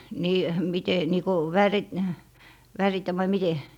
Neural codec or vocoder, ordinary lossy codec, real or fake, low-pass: none; none; real; 19.8 kHz